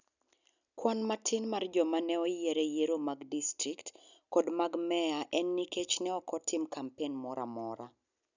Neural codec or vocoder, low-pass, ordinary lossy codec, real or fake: none; 7.2 kHz; none; real